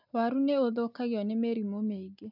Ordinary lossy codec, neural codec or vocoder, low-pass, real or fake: none; none; 5.4 kHz; real